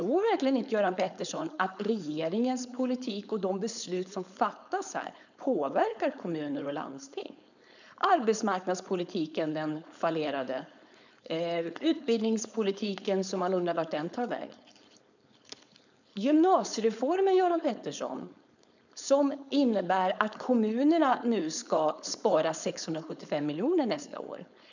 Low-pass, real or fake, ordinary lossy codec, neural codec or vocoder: 7.2 kHz; fake; none; codec, 16 kHz, 4.8 kbps, FACodec